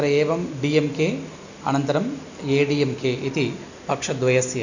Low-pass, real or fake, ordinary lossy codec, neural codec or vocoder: 7.2 kHz; real; none; none